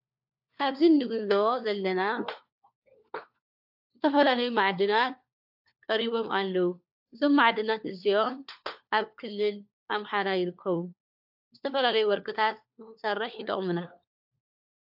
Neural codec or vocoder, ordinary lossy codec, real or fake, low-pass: codec, 16 kHz, 4 kbps, FunCodec, trained on LibriTTS, 50 frames a second; AAC, 48 kbps; fake; 5.4 kHz